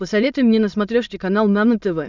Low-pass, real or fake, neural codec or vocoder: 7.2 kHz; fake; autoencoder, 22.05 kHz, a latent of 192 numbers a frame, VITS, trained on many speakers